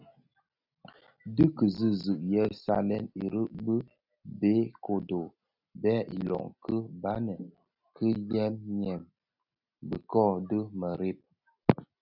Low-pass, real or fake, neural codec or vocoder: 5.4 kHz; real; none